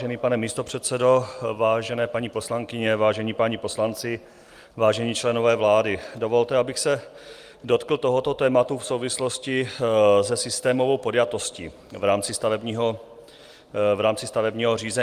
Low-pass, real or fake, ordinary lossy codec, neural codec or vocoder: 14.4 kHz; real; Opus, 32 kbps; none